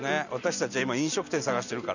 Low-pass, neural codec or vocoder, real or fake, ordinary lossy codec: 7.2 kHz; none; real; none